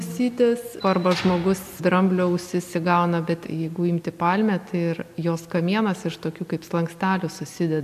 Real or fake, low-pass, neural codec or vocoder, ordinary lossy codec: real; 14.4 kHz; none; AAC, 96 kbps